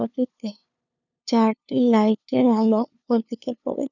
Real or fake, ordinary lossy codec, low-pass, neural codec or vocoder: fake; none; 7.2 kHz; codec, 16 kHz, 2 kbps, FunCodec, trained on LibriTTS, 25 frames a second